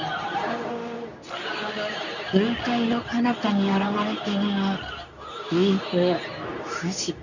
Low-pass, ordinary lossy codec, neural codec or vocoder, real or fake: 7.2 kHz; none; codec, 24 kHz, 0.9 kbps, WavTokenizer, medium speech release version 2; fake